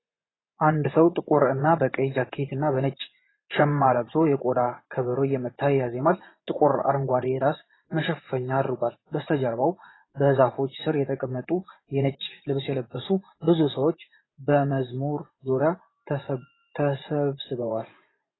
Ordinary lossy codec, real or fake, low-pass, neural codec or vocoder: AAC, 16 kbps; fake; 7.2 kHz; vocoder, 24 kHz, 100 mel bands, Vocos